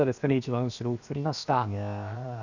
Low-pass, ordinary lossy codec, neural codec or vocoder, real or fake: 7.2 kHz; none; codec, 16 kHz, 0.7 kbps, FocalCodec; fake